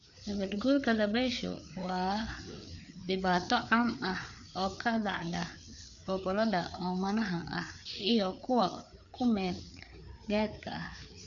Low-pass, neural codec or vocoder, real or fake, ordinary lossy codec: 7.2 kHz; codec, 16 kHz, 4 kbps, FreqCodec, larger model; fake; none